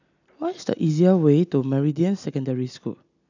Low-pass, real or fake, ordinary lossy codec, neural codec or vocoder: 7.2 kHz; real; none; none